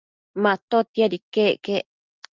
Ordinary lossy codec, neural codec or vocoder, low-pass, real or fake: Opus, 32 kbps; none; 7.2 kHz; real